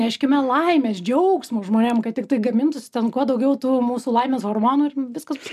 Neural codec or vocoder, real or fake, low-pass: none; real; 14.4 kHz